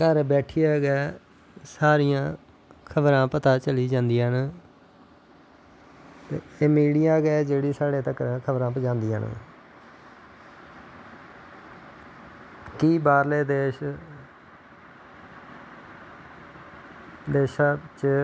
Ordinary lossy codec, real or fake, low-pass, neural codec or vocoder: none; real; none; none